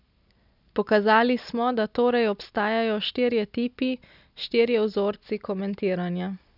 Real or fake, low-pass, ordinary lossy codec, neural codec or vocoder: real; 5.4 kHz; none; none